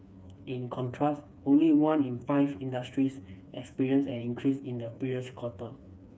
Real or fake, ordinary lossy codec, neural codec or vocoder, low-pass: fake; none; codec, 16 kHz, 4 kbps, FreqCodec, smaller model; none